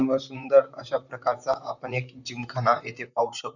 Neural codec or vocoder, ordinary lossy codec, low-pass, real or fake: vocoder, 44.1 kHz, 128 mel bands, Pupu-Vocoder; none; 7.2 kHz; fake